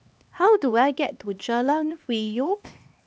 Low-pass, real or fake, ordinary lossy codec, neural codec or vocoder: none; fake; none; codec, 16 kHz, 2 kbps, X-Codec, HuBERT features, trained on LibriSpeech